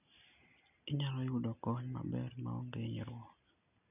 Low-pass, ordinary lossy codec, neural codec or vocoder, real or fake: 3.6 kHz; none; none; real